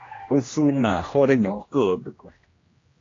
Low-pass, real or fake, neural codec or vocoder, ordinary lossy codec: 7.2 kHz; fake; codec, 16 kHz, 1 kbps, X-Codec, HuBERT features, trained on general audio; AAC, 32 kbps